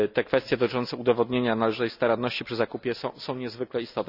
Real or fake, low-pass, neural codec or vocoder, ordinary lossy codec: real; 5.4 kHz; none; none